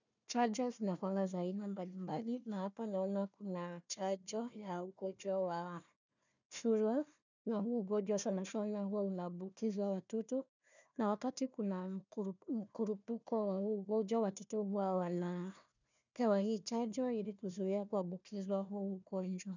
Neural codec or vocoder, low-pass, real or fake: codec, 16 kHz, 1 kbps, FunCodec, trained on Chinese and English, 50 frames a second; 7.2 kHz; fake